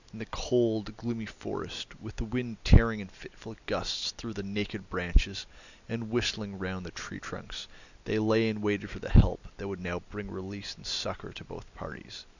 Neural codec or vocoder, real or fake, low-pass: none; real; 7.2 kHz